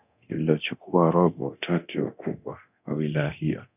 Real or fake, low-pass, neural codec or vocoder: fake; 3.6 kHz; codec, 24 kHz, 0.9 kbps, DualCodec